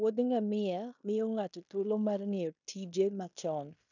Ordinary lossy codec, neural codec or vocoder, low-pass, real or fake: none; codec, 16 kHz in and 24 kHz out, 0.9 kbps, LongCat-Audio-Codec, fine tuned four codebook decoder; 7.2 kHz; fake